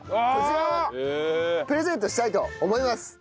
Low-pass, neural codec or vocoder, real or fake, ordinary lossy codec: none; none; real; none